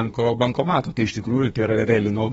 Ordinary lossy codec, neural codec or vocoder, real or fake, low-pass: AAC, 24 kbps; codec, 32 kHz, 1.9 kbps, SNAC; fake; 14.4 kHz